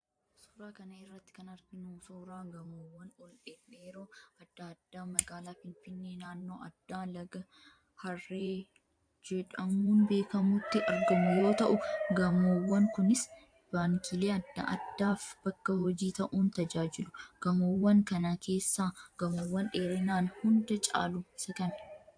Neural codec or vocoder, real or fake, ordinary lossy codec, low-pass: vocoder, 44.1 kHz, 128 mel bands every 512 samples, BigVGAN v2; fake; AAC, 64 kbps; 9.9 kHz